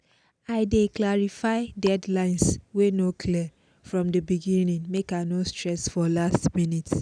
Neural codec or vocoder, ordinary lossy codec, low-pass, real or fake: none; none; 9.9 kHz; real